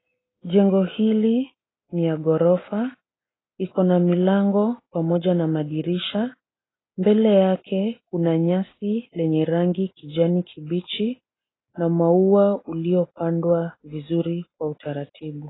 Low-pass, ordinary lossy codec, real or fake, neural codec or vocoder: 7.2 kHz; AAC, 16 kbps; real; none